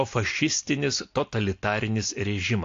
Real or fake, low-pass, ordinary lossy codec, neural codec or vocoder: real; 7.2 kHz; AAC, 48 kbps; none